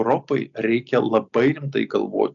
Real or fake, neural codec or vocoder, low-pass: real; none; 7.2 kHz